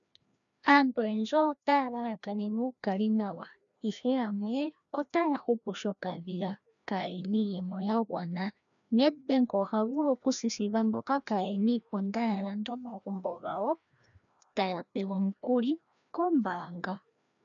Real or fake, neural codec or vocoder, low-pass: fake; codec, 16 kHz, 1 kbps, FreqCodec, larger model; 7.2 kHz